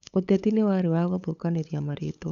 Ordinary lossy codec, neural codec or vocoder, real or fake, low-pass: none; codec, 16 kHz, 8 kbps, FunCodec, trained on LibriTTS, 25 frames a second; fake; 7.2 kHz